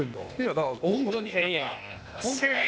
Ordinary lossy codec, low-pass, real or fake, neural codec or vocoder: none; none; fake; codec, 16 kHz, 0.8 kbps, ZipCodec